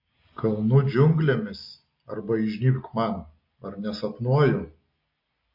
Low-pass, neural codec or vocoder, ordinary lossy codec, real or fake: 5.4 kHz; none; MP3, 32 kbps; real